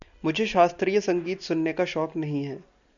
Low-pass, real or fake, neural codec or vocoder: 7.2 kHz; real; none